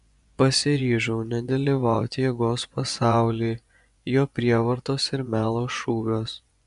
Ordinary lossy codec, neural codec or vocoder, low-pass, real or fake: AAC, 96 kbps; vocoder, 24 kHz, 100 mel bands, Vocos; 10.8 kHz; fake